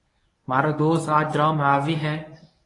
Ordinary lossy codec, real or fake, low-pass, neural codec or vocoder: AAC, 32 kbps; fake; 10.8 kHz; codec, 24 kHz, 0.9 kbps, WavTokenizer, medium speech release version 1